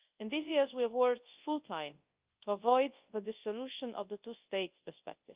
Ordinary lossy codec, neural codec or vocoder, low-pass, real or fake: Opus, 32 kbps; codec, 24 kHz, 0.9 kbps, WavTokenizer, large speech release; 3.6 kHz; fake